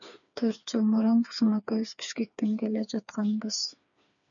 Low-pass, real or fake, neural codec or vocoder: 7.2 kHz; fake; codec, 16 kHz, 4 kbps, FreqCodec, smaller model